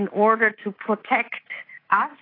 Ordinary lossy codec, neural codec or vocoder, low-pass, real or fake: AAC, 24 kbps; codec, 24 kHz, 3.1 kbps, DualCodec; 5.4 kHz; fake